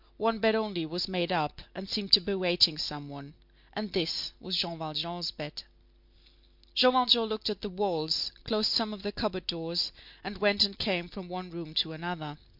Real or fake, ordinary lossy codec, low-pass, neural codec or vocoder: real; MP3, 48 kbps; 5.4 kHz; none